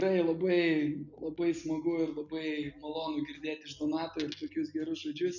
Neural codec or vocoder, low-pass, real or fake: none; 7.2 kHz; real